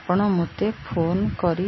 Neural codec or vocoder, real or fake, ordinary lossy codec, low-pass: none; real; MP3, 24 kbps; 7.2 kHz